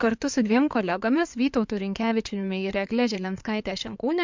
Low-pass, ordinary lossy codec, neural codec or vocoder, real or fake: 7.2 kHz; MP3, 64 kbps; codec, 16 kHz in and 24 kHz out, 2.2 kbps, FireRedTTS-2 codec; fake